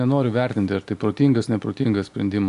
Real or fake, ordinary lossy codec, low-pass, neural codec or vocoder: real; AAC, 64 kbps; 10.8 kHz; none